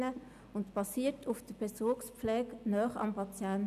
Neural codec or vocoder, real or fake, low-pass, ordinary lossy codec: none; real; 14.4 kHz; none